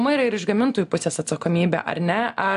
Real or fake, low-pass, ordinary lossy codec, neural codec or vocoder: real; 10.8 kHz; AAC, 96 kbps; none